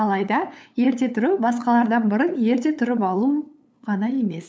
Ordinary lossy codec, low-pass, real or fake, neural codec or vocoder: none; none; fake; codec, 16 kHz, 8 kbps, FunCodec, trained on LibriTTS, 25 frames a second